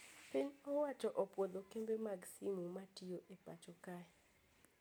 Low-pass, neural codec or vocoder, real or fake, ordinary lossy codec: none; none; real; none